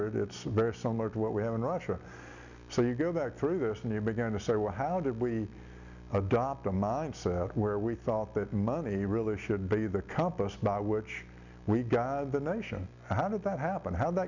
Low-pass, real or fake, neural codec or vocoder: 7.2 kHz; real; none